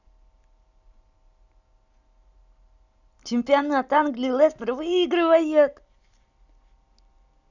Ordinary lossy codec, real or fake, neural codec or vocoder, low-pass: none; real; none; 7.2 kHz